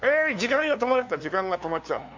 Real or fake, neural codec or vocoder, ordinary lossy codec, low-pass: fake; codec, 16 kHz, 2 kbps, FunCodec, trained on LibriTTS, 25 frames a second; MP3, 64 kbps; 7.2 kHz